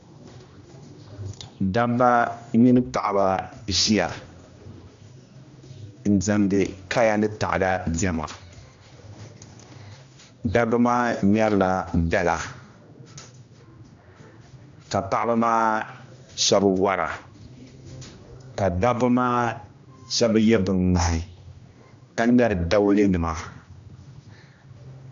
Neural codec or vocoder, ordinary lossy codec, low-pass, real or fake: codec, 16 kHz, 1 kbps, X-Codec, HuBERT features, trained on general audio; AAC, 64 kbps; 7.2 kHz; fake